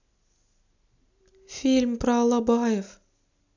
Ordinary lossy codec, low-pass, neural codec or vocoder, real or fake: none; 7.2 kHz; none; real